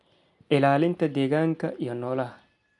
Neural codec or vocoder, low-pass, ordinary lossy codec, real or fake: none; 10.8 kHz; none; real